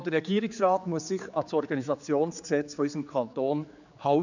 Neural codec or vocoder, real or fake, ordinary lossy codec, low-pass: codec, 16 kHz, 4 kbps, X-Codec, HuBERT features, trained on general audio; fake; none; 7.2 kHz